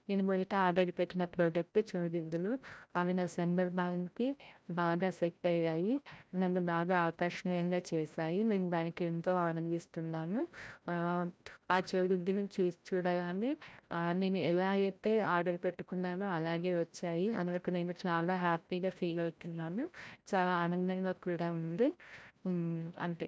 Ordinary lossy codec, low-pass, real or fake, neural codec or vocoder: none; none; fake; codec, 16 kHz, 0.5 kbps, FreqCodec, larger model